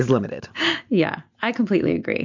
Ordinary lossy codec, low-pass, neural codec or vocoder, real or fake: MP3, 48 kbps; 7.2 kHz; none; real